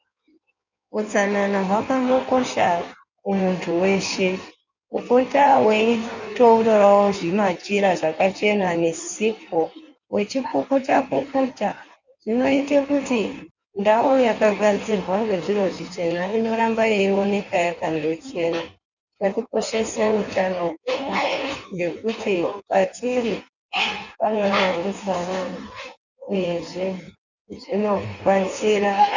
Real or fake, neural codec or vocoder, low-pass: fake; codec, 16 kHz in and 24 kHz out, 1.1 kbps, FireRedTTS-2 codec; 7.2 kHz